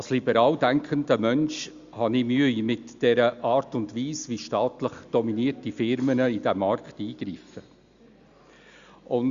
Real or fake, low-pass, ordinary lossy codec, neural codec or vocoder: real; 7.2 kHz; Opus, 64 kbps; none